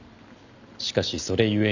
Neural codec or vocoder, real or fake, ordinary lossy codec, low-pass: none; real; none; 7.2 kHz